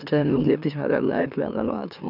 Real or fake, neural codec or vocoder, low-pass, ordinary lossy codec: fake; autoencoder, 44.1 kHz, a latent of 192 numbers a frame, MeloTTS; 5.4 kHz; none